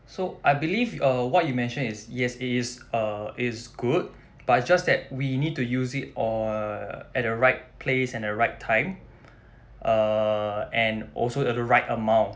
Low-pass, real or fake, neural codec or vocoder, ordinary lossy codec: none; real; none; none